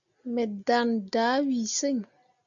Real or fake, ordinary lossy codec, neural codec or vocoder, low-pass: real; MP3, 96 kbps; none; 7.2 kHz